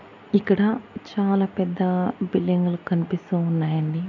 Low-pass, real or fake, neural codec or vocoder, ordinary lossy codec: 7.2 kHz; real; none; none